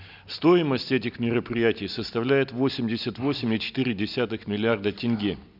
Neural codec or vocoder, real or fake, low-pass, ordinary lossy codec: none; real; 5.4 kHz; none